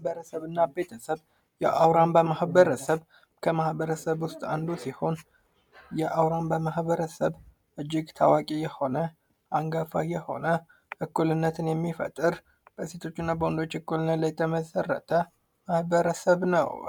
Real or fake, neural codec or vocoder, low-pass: real; none; 19.8 kHz